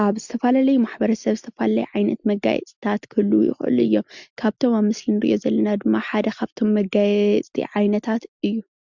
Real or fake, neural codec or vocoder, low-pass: real; none; 7.2 kHz